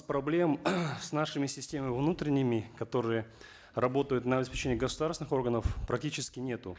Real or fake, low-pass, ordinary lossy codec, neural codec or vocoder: real; none; none; none